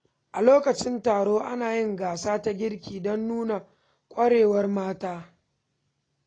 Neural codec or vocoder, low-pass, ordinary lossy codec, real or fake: none; 9.9 kHz; AAC, 32 kbps; real